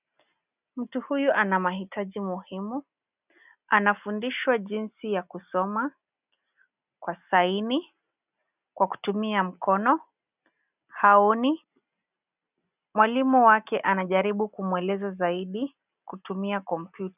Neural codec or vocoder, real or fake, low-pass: none; real; 3.6 kHz